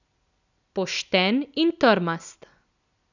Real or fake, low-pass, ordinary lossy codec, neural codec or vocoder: real; 7.2 kHz; none; none